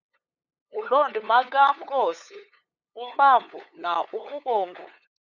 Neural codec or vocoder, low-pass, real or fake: codec, 16 kHz, 8 kbps, FunCodec, trained on LibriTTS, 25 frames a second; 7.2 kHz; fake